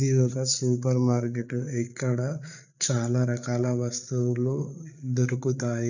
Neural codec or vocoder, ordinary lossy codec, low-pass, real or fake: codec, 16 kHz, 8 kbps, FreqCodec, larger model; none; 7.2 kHz; fake